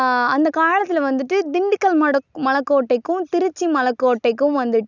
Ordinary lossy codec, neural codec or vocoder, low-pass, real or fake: none; none; 7.2 kHz; real